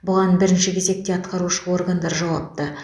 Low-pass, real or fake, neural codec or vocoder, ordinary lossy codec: none; real; none; none